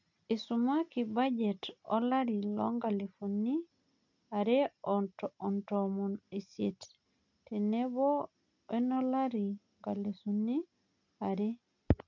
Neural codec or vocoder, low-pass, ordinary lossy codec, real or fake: none; 7.2 kHz; none; real